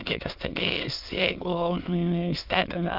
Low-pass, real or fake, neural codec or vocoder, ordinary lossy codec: 5.4 kHz; fake; autoencoder, 22.05 kHz, a latent of 192 numbers a frame, VITS, trained on many speakers; Opus, 24 kbps